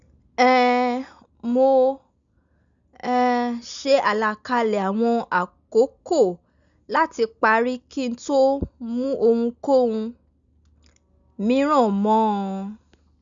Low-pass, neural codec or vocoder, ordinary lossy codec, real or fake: 7.2 kHz; none; none; real